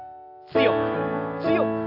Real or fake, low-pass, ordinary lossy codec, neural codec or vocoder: real; 5.4 kHz; AAC, 48 kbps; none